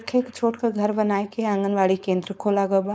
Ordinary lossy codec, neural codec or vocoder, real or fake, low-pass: none; codec, 16 kHz, 4.8 kbps, FACodec; fake; none